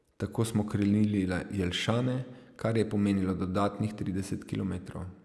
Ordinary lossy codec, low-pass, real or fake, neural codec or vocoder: none; none; real; none